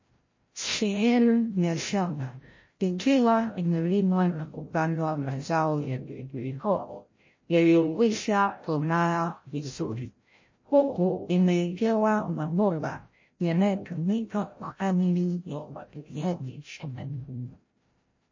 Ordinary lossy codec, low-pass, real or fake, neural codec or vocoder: MP3, 32 kbps; 7.2 kHz; fake; codec, 16 kHz, 0.5 kbps, FreqCodec, larger model